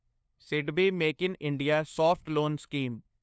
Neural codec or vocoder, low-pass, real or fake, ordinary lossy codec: codec, 16 kHz, 2 kbps, FunCodec, trained on LibriTTS, 25 frames a second; none; fake; none